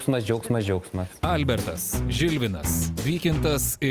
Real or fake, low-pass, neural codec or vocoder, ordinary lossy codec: real; 14.4 kHz; none; Opus, 32 kbps